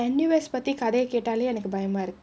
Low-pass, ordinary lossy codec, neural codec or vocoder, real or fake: none; none; none; real